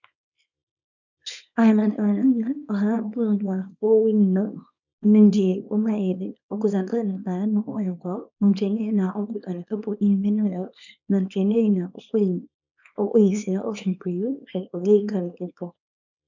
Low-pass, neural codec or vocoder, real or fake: 7.2 kHz; codec, 24 kHz, 0.9 kbps, WavTokenizer, small release; fake